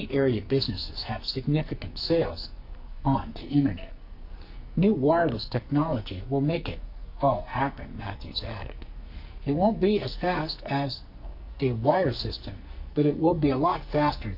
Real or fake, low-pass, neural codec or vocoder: fake; 5.4 kHz; codec, 44.1 kHz, 2.6 kbps, SNAC